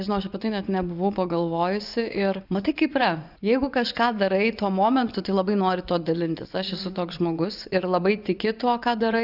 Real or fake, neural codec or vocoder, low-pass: fake; vocoder, 24 kHz, 100 mel bands, Vocos; 5.4 kHz